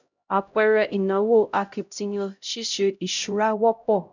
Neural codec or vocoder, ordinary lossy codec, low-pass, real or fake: codec, 16 kHz, 0.5 kbps, X-Codec, HuBERT features, trained on LibriSpeech; none; 7.2 kHz; fake